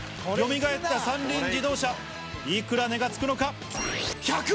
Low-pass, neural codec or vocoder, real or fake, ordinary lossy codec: none; none; real; none